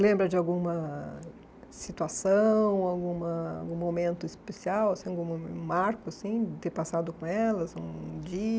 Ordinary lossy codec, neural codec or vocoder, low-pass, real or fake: none; none; none; real